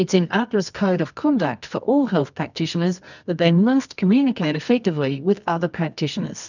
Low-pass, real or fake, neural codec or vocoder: 7.2 kHz; fake; codec, 24 kHz, 0.9 kbps, WavTokenizer, medium music audio release